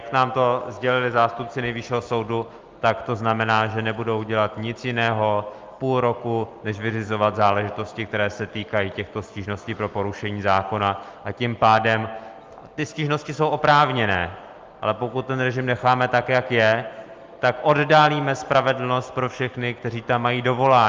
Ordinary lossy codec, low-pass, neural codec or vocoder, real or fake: Opus, 32 kbps; 7.2 kHz; none; real